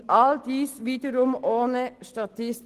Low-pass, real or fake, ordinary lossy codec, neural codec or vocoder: 14.4 kHz; real; Opus, 16 kbps; none